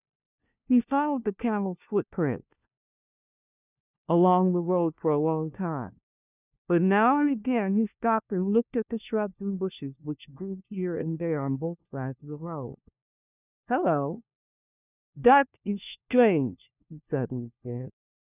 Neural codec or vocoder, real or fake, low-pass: codec, 16 kHz, 1 kbps, FunCodec, trained on LibriTTS, 50 frames a second; fake; 3.6 kHz